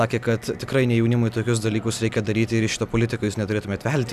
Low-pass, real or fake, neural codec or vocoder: 14.4 kHz; real; none